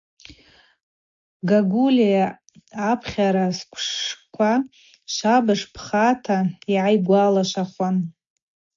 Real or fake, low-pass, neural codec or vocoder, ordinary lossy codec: real; 7.2 kHz; none; MP3, 48 kbps